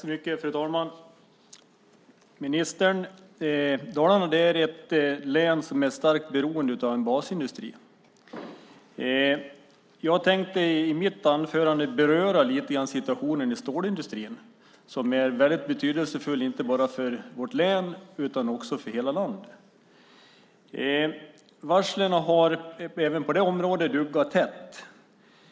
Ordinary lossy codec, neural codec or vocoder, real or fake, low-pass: none; none; real; none